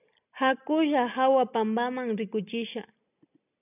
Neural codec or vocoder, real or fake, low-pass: none; real; 3.6 kHz